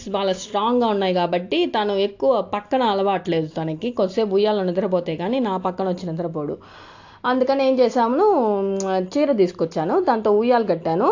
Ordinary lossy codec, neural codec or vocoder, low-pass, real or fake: AAC, 48 kbps; none; 7.2 kHz; real